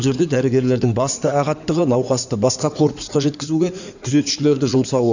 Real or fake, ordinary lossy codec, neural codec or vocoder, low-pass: fake; none; codec, 16 kHz in and 24 kHz out, 2.2 kbps, FireRedTTS-2 codec; 7.2 kHz